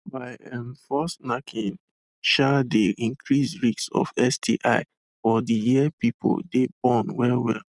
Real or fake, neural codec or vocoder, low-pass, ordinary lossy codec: real; none; 10.8 kHz; none